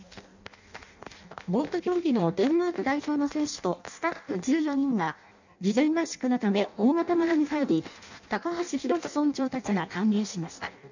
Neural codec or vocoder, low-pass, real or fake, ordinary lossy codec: codec, 16 kHz in and 24 kHz out, 0.6 kbps, FireRedTTS-2 codec; 7.2 kHz; fake; none